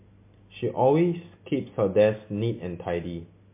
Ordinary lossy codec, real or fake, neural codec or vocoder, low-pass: MP3, 24 kbps; real; none; 3.6 kHz